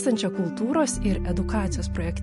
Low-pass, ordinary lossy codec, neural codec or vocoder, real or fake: 14.4 kHz; MP3, 48 kbps; none; real